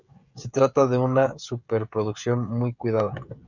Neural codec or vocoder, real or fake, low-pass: codec, 16 kHz, 16 kbps, FreqCodec, smaller model; fake; 7.2 kHz